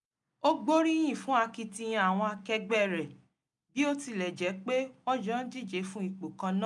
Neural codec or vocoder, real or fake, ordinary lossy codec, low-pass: none; real; none; 10.8 kHz